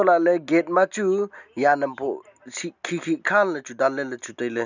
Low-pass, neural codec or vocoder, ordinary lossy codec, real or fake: 7.2 kHz; none; none; real